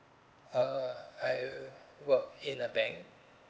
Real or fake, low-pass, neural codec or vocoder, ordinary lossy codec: fake; none; codec, 16 kHz, 0.8 kbps, ZipCodec; none